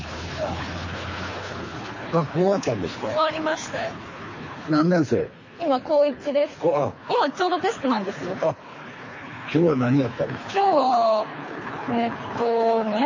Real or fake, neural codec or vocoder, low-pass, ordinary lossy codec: fake; codec, 24 kHz, 3 kbps, HILCodec; 7.2 kHz; MP3, 32 kbps